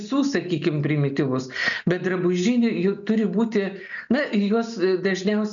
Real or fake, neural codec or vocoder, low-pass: real; none; 7.2 kHz